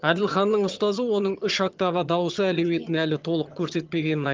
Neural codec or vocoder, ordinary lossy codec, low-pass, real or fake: vocoder, 22.05 kHz, 80 mel bands, HiFi-GAN; Opus, 32 kbps; 7.2 kHz; fake